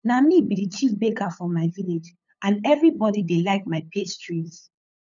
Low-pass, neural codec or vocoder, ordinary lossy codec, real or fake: 7.2 kHz; codec, 16 kHz, 8 kbps, FunCodec, trained on LibriTTS, 25 frames a second; none; fake